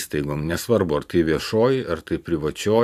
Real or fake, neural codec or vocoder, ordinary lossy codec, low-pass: fake; vocoder, 44.1 kHz, 128 mel bands every 512 samples, BigVGAN v2; AAC, 96 kbps; 14.4 kHz